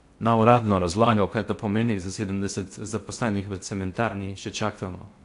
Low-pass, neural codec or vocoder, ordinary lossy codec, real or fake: 10.8 kHz; codec, 16 kHz in and 24 kHz out, 0.6 kbps, FocalCodec, streaming, 4096 codes; AAC, 64 kbps; fake